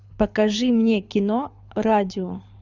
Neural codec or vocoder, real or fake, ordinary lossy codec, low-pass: codec, 24 kHz, 6 kbps, HILCodec; fake; Opus, 64 kbps; 7.2 kHz